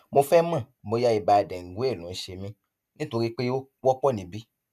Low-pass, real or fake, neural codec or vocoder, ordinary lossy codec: 14.4 kHz; real; none; none